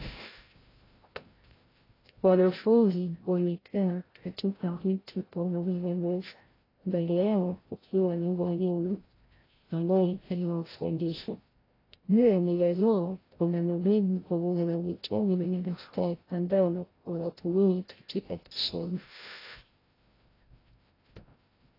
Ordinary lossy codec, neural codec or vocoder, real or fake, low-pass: AAC, 24 kbps; codec, 16 kHz, 0.5 kbps, FreqCodec, larger model; fake; 5.4 kHz